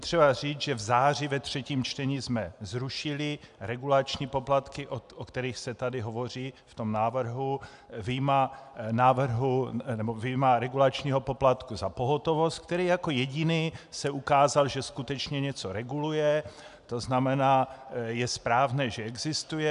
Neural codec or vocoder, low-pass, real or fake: none; 10.8 kHz; real